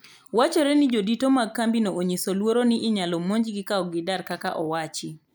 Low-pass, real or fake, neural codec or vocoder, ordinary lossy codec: none; real; none; none